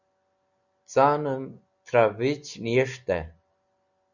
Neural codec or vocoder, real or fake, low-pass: none; real; 7.2 kHz